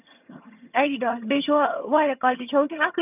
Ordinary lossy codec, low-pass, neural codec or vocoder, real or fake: none; 3.6 kHz; vocoder, 22.05 kHz, 80 mel bands, HiFi-GAN; fake